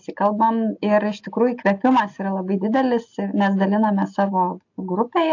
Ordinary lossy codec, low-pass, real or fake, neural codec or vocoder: AAC, 48 kbps; 7.2 kHz; real; none